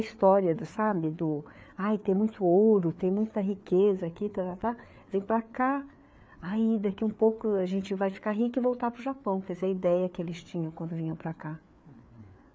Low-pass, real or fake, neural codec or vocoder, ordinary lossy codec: none; fake; codec, 16 kHz, 4 kbps, FreqCodec, larger model; none